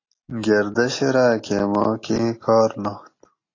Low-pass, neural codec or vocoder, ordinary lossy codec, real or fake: 7.2 kHz; none; AAC, 32 kbps; real